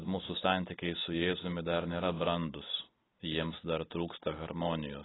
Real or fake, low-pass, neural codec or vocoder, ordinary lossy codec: real; 7.2 kHz; none; AAC, 16 kbps